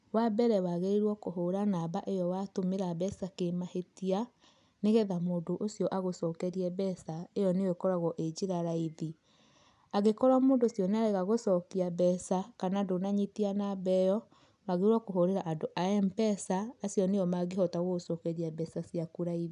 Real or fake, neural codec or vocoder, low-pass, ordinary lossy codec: real; none; 10.8 kHz; none